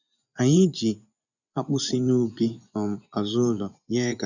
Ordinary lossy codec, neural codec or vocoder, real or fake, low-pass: none; vocoder, 24 kHz, 100 mel bands, Vocos; fake; 7.2 kHz